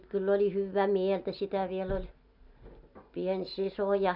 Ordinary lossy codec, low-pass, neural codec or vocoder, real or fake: none; 5.4 kHz; none; real